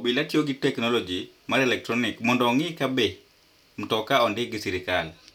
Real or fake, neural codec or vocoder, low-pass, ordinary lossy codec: real; none; 19.8 kHz; none